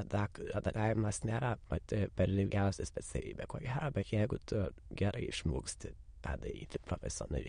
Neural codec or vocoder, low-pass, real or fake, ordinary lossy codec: autoencoder, 22.05 kHz, a latent of 192 numbers a frame, VITS, trained on many speakers; 9.9 kHz; fake; MP3, 48 kbps